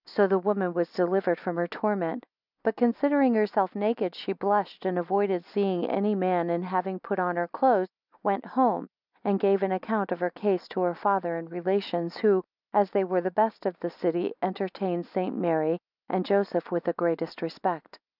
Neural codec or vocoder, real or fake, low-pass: none; real; 5.4 kHz